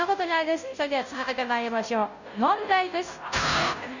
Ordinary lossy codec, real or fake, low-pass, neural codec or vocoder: none; fake; 7.2 kHz; codec, 16 kHz, 0.5 kbps, FunCodec, trained on Chinese and English, 25 frames a second